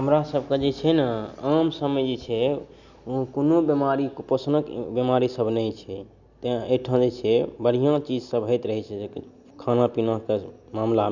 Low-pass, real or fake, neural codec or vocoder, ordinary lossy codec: 7.2 kHz; real; none; none